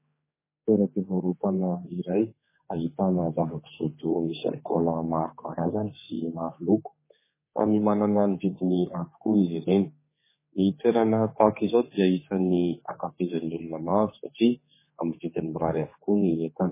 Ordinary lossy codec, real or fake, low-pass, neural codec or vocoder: MP3, 16 kbps; fake; 3.6 kHz; codec, 16 kHz, 4 kbps, X-Codec, HuBERT features, trained on general audio